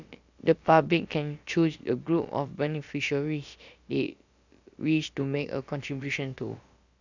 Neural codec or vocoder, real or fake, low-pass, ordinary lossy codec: codec, 16 kHz, about 1 kbps, DyCAST, with the encoder's durations; fake; 7.2 kHz; Opus, 64 kbps